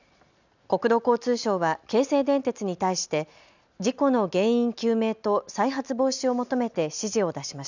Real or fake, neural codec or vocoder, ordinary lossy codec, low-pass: real; none; none; 7.2 kHz